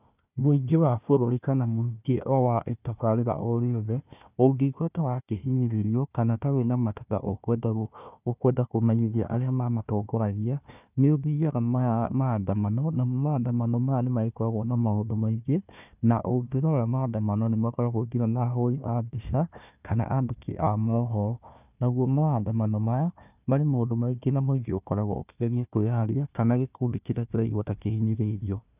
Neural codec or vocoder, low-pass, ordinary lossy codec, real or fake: codec, 16 kHz, 1 kbps, FunCodec, trained on Chinese and English, 50 frames a second; 3.6 kHz; none; fake